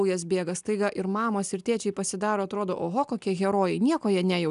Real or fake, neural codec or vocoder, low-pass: real; none; 10.8 kHz